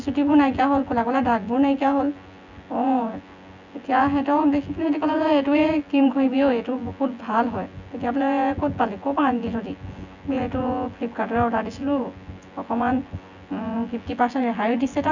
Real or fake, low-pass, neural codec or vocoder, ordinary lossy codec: fake; 7.2 kHz; vocoder, 24 kHz, 100 mel bands, Vocos; none